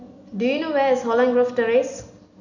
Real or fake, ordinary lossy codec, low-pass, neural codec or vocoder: real; none; 7.2 kHz; none